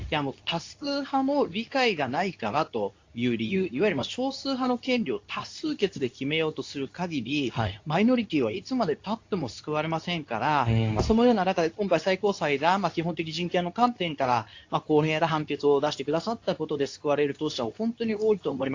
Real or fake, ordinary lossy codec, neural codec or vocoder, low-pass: fake; AAC, 48 kbps; codec, 24 kHz, 0.9 kbps, WavTokenizer, medium speech release version 2; 7.2 kHz